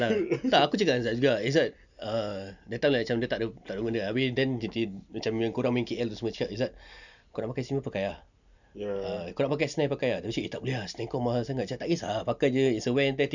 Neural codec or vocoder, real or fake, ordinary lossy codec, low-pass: none; real; none; 7.2 kHz